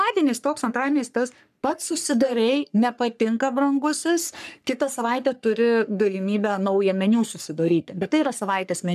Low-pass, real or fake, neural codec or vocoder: 14.4 kHz; fake; codec, 44.1 kHz, 3.4 kbps, Pupu-Codec